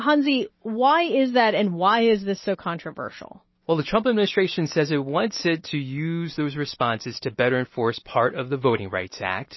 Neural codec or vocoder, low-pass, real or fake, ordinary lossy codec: none; 7.2 kHz; real; MP3, 24 kbps